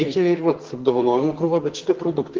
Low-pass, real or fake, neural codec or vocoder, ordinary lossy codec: 7.2 kHz; fake; codec, 44.1 kHz, 2.6 kbps, SNAC; Opus, 16 kbps